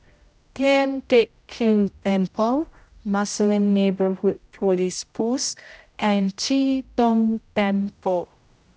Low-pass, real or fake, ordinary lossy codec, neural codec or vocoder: none; fake; none; codec, 16 kHz, 0.5 kbps, X-Codec, HuBERT features, trained on general audio